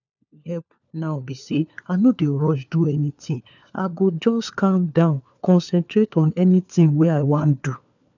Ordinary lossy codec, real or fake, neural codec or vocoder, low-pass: none; fake; codec, 16 kHz, 4 kbps, FunCodec, trained on LibriTTS, 50 frames a second; 7.2 kHz